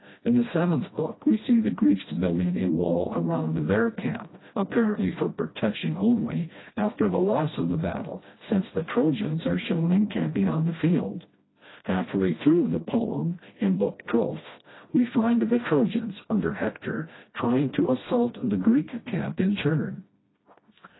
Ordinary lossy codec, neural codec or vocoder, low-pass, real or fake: AAC, 16 kbps; codec, 16 kHz, 1 kbps, FreqCodec, smaller model; 7.2 kHz; fake